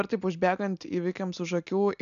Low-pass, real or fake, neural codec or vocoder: 7.2 kHz; real; none